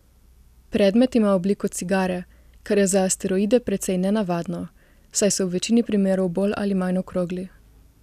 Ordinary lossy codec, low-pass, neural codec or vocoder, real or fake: none; 14.4 kHz; none; real